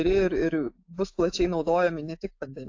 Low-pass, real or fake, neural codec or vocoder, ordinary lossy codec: 7.2 kHz; fake; autoencoder, 48 kHz, 128 numbers a frame, DAC-VAE, trained on Japanese speech; AAC, 48 kbps